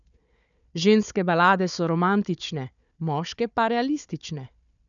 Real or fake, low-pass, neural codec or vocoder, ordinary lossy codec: fake; 7.2 kHz; codec, 16 kHz, 4 kbps, FunCodec, trained on Chinese and English, 50 frames a second; none